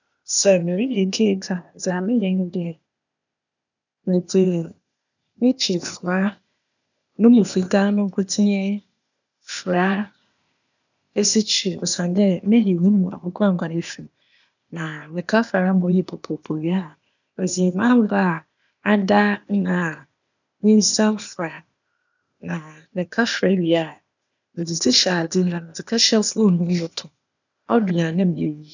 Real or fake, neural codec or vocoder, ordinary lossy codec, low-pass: fake; codec, 16 kHz, 0.8 kbps, ZipCodec; none; 7.2 kHz